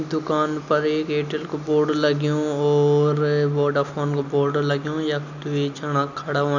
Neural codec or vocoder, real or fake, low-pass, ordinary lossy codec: none; real; 7.2 kHz; none